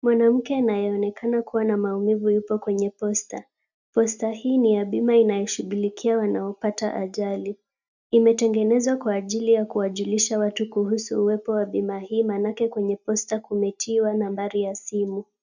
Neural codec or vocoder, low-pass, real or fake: none; 7.2 kHz; real